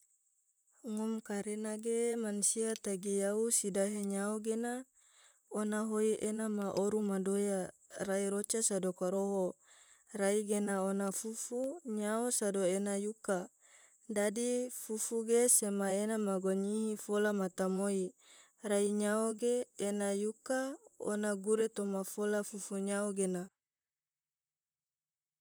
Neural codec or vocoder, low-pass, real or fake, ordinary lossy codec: vocoder, 44.1 kHz, 128 mel bands, Pupu-Vocoder; none; fake; none